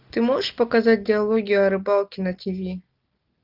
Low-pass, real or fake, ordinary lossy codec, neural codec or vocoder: 5.4 kHz; real; Opus, 32 kbps; none